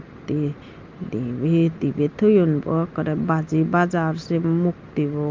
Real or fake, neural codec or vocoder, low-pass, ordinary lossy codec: real; none; 7.2 kHz; Opus, 24 kbps